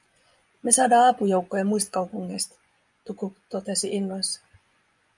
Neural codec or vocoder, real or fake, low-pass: vocoder, 24 kHz, 100 mel bands, Vocos; fake; 10.8 kHz